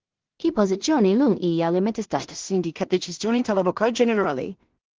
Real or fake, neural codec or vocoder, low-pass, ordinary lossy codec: fake; codec, 16 kHz in and 24 kHz out, 0.4 kbps, LongCat-Audio-Codec, two codebook decoder; 7.2 kHz; Opus, 16 kbps